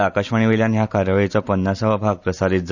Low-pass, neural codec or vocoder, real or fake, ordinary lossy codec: 7.2 kHz; none; real; none